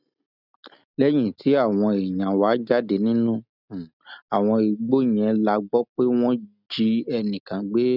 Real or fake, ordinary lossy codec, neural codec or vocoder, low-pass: real; none; none; 5.4 kHz